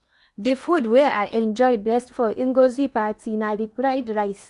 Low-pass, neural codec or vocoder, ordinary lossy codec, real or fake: 10.8 kHz; codec, 16 kHz in and 24 kHz out, 0.8 kbps, FocalCodec, streaming, 65536 codes; none; fake